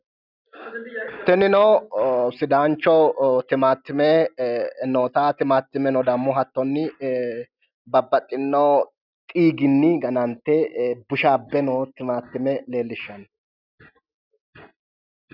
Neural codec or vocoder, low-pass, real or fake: none; 5.4 kHz; real